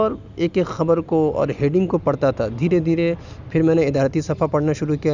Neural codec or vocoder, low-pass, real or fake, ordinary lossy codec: none; 7.2 kHz; real; none